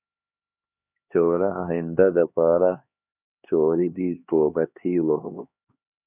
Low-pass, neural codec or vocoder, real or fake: 3.6 kHz; codec, 16 kHz, 2 kbps, X-Codec, HuBERT features, trained on LibriSpeech; fake